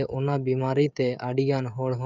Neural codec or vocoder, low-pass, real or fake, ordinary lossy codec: codec, 16 kHz, 16 kbps, FreqCodec, smaller model; 7.2 kHz; fake; none